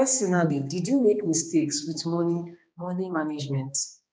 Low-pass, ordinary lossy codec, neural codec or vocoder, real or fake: none; none; codec, 16 kHz, 2 kbps, X-Codec, HuBERT features, trained on balanced general audio; fake